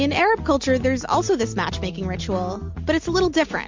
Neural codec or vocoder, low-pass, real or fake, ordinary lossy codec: none; 7.2 kHz; real; MP3, 48 kbps